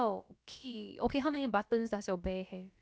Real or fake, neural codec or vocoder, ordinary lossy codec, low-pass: fake; codec, 16 kHz, about 1 kbps, DyCAST, with the encoder's durations; none; none